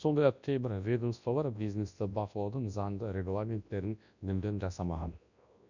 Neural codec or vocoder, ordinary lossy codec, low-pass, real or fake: codec, 24 kHz, 0.9 kbps, WavTokenizer, large speech release; none; 7.2 kHz; fake